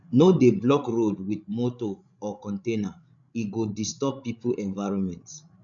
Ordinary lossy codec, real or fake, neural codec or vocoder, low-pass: none; fake; codec, 16 kHz, 16 kbps, FreqCodec, larger model; 7.2 kHz